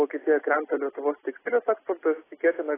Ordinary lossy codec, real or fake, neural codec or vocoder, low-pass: AAC, 16 kbps; real; none; 3.6 kHz